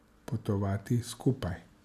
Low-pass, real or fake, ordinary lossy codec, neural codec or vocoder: 14.4 kHz; real; none; none